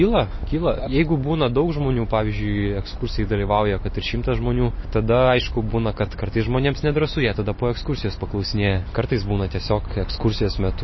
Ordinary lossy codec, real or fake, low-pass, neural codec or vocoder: MP3, 24 kbps; real; 7.2 kHz; none